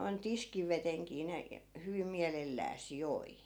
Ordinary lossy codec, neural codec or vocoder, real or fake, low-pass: none; none; real; none